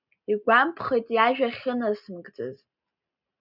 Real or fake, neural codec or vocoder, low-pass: real; none; 5.4 kHz